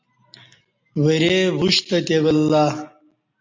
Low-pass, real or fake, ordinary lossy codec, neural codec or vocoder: 7.2 kHz; real; MP3, 48 kbps; none